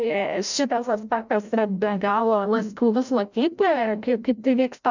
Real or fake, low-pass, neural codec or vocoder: fake; 7.2 kHz; codec, 16 kHz, 0.5 kbps, FreqCodec, larger model